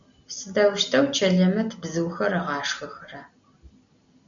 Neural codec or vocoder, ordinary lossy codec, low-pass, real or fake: none; MP3, 96 kbps; 7.2 kHz; real